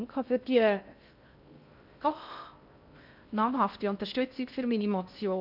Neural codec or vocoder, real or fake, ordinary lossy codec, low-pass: codec, 16 kHz in and 24 kHz out, 0.6 kbps, FocalCodec, streaming, 2048 codes; fake; none; 5.4 kHz